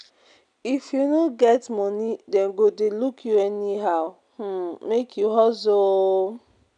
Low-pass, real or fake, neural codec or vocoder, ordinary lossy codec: 9.9 kHz; real; none; none